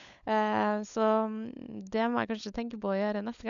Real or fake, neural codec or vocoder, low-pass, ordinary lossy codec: fake; codec, 16 kHz, 16 kbps, FunCodec, trained on LibriTTS, 50 frames a second; 7.2 kHz; none